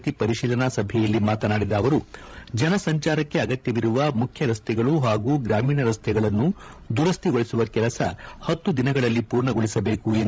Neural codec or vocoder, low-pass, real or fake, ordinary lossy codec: codec, 16 kHz, 16 kbps, FreqCodec, larger model; none; fake; none